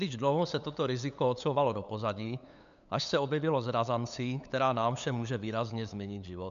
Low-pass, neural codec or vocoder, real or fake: 7.2 kHz; codec, 16 kHz, 8 kbps, FunCodec, trained on LibriTTS, 25 frames a second; fake